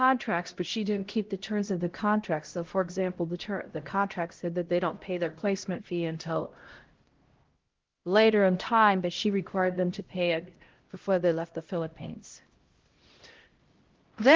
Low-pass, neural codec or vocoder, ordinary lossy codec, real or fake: 7.2 kHz; codec, 16 kHz, 0.5 kbps, X-Codec, HuBERT features, trained on LibriSpeech; Opus, 16 kbps; fake